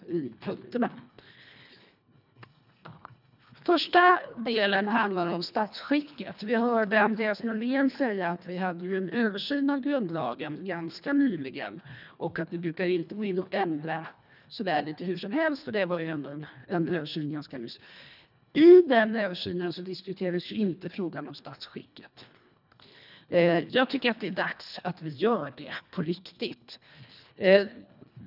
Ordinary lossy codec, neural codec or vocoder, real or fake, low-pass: none; codec, 24 kHz, 1.5 kbps, HILCodec; fake; 5.4 kHz